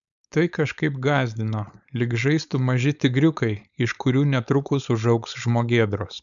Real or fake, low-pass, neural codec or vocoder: fake; 7.2 kHz; codec, 16 kHz, 4.8 kbps, FACodec